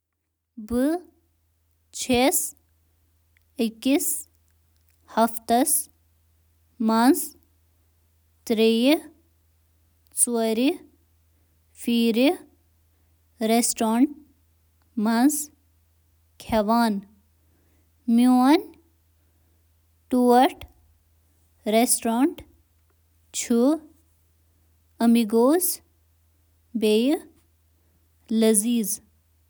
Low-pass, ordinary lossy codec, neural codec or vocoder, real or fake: none; none; none; real